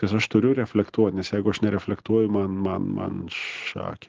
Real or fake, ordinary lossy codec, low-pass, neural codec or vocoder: real; Opus, 16 kbps; 7.2 kHz; none